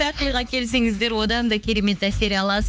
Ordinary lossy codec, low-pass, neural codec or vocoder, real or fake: none; none; codec, 16 kHz, 4 kbps, X-Codec, HuBERT features, trained on LibriSpeech; fake